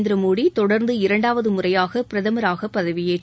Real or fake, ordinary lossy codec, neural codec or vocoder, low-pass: real; none; none; none